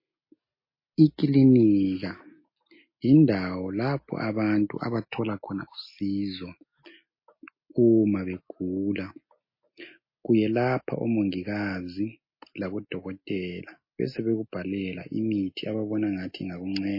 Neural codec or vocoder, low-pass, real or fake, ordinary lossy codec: none; 5.4 kHz; real; MP3, 24 kbps